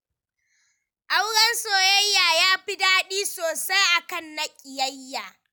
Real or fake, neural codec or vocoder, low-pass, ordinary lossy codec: real; none; none; none